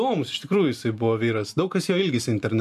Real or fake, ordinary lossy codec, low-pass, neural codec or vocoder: fake; MP3, 96 kbps; 14.4 kHz; vocoder, 44.1 kHz, 128 mel bands every 512 samples, BigVGAN v2